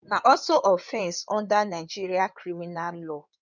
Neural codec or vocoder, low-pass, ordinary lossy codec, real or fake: codec, 16 kHz in and 24 kHz out, 2.2 kbps, FireRedTTS-2 codec; 7.2 kHz; none; fake